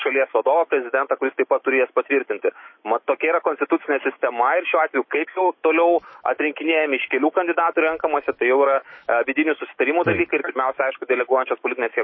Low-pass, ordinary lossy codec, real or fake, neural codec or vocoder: 7.2 kHz; MP3, 24 kbps; fake; autoencoder, 48 kHz, 128 numbers a frame, DAC-VAE, trained on Japanese speech